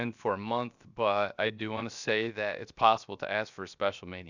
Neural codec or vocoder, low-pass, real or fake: codec, 16 kHz, 0.7 kbps, FocalCodec; 7.2 kHz; fake